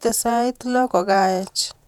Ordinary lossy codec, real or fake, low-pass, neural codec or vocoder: none; fake; 19.8 kHz; vocoder, 48 kHz, 128 mel bands, Vocos